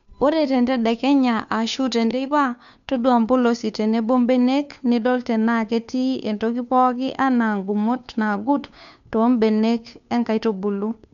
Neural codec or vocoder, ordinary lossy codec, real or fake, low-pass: codec, 16 kHz, 2 kbps, FunCodec, trained on Chinese and English, 25 frames a second; none; fake; 7.2 kHz